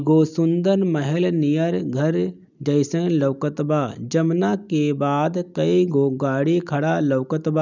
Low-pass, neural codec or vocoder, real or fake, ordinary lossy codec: 7.2 kHz; none; real; none